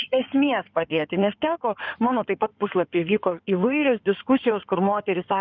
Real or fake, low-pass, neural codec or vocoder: fake; 7.2 kHz; codec, 16 kHz in and 24 kHz out, 2.2 kbps, FireRedTTS-2 codec